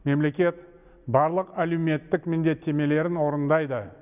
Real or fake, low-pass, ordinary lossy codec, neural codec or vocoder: real; 3.6 kHz; none; none